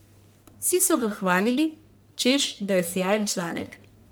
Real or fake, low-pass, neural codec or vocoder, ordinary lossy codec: fake; none; codec, 44.1 kHz, 1.7 kbps, Pupu-Codec; none